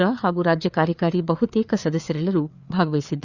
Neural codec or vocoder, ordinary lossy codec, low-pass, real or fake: codec, 16 kHz, 4 kbps, FreqCodec, larger model; Opus, 64 kbps; 7.2 kHz; fake